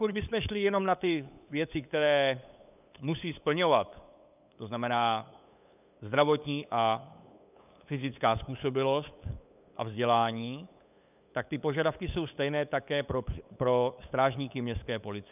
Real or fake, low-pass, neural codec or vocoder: fake; 3.6 kHz; codec, 16 kHz, 8 kbps, FunCodec, trained on LibriTTS, 25 frames a second